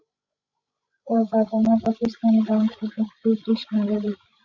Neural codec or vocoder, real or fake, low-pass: codec, 16 kHz, 16 kbps, FreqCodec, larger model; fake; 7.2 kHz